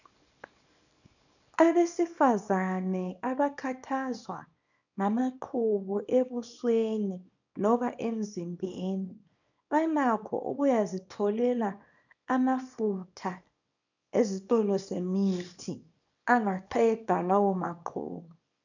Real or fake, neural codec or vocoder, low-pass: fake; codec, 24 kHz, 0.9 kbps, WavTokenizer, small release; 7.2 kHz